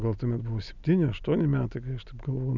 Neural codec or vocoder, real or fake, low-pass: none; real; 7.2 kHz